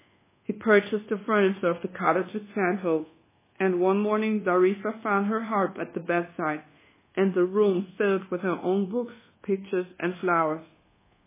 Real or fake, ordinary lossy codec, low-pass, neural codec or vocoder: fake; MP3, 16 kbps; 3.6 kHz; codec, 24 kHz, 1.2 kbps, DualCodec